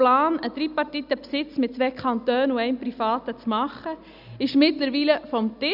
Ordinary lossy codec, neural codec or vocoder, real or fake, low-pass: none; none; real; 5.4 kHz